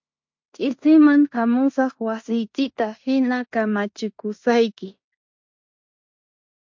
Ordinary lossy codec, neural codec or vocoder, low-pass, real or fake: MP3, 48 kbps; codec, 16 kHz in and 24 kHz out, 0.9 kbps, LongCat-Audio-Codec, fine tuned four codebook decoder; 7.2 kHz; fake